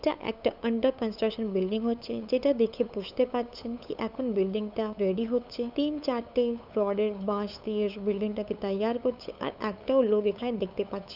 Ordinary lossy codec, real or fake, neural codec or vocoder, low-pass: none; fake; codec, 16 kHz, 8 kbps, FunCodec, trained on LibriTTS, 25 frames a second; 5.4 kHz